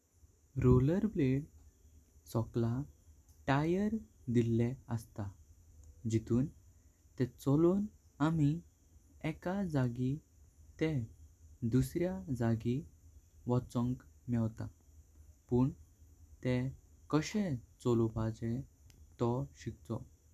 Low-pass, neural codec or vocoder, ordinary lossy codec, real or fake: 14.4 kHz; none; none; real